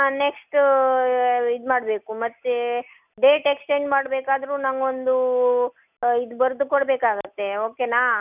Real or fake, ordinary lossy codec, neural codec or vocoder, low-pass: real; none; none; 3.6 kHz